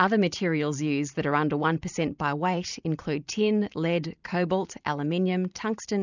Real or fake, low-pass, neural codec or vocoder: fake; 7.2 kHz; vocoder, 44.1 kHz, 128 mel bands every 512 samples, BigVGAN v2